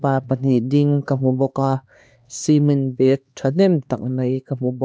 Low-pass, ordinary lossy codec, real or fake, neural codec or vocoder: none; none; fake; codec, 16 kHz, 2 kbps, X-Codec, HuBERT features, trained on LibriSpeech